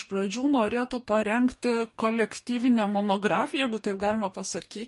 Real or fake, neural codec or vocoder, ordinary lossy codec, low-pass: fake; codec, 44.1 kHz, 2.6 kbps, DAC; MP3, 48 kbps; 14.4 kHz